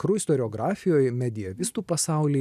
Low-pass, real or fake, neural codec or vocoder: 14.4 kHz; real; none